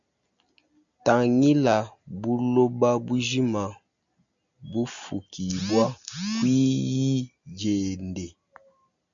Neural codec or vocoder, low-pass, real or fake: none; 7.2 kHz; real